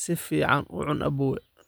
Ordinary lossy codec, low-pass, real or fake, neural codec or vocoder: none; none; real; none